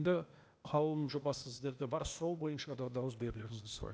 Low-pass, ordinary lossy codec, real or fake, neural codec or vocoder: none; none; fake; codec, 16 kHz, 0.8 kbps, ZipCodec